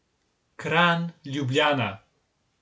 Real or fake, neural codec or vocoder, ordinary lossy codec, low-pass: real; none; none; none